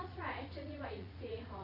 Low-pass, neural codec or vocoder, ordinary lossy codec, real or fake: 5.4 kHz; vocoder, 44.1 kHz, 80 mel bands, Vocos; none; fake